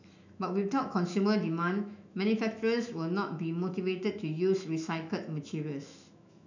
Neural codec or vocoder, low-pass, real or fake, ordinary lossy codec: autoencoder, 48 kHz, 128 numbers a frame, DAC-VAE, trained on Japanese speech; 7.2 kHz; fake; none